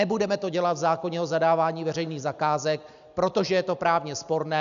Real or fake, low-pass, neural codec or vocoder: real; 7.2 kHz; none